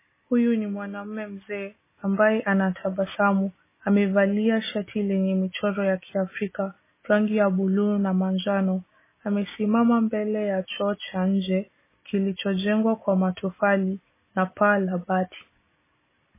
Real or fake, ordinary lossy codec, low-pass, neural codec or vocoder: real; MP3, 16 kbps; 3.6 kHz; none